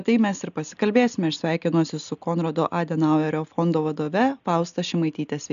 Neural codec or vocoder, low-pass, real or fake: none; 7.2 kHz; real